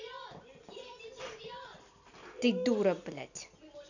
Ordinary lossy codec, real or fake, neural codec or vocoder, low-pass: none; real; none; 7.2 kHz